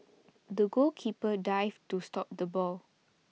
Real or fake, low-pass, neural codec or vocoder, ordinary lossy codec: real; none; none; none